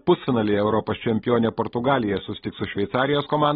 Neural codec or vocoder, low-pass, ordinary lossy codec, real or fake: none; 19.8 kHz; AAC, 16 kbps; real